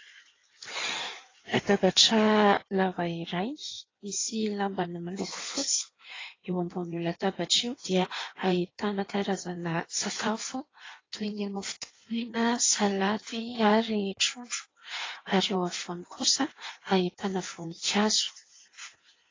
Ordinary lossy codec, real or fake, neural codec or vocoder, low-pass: AAC, 32 kbps; fake; codec, 16 kHz in and 24 kHz out, 1.1 kbps, FireRedTTS-2 codec; 7.2 kHz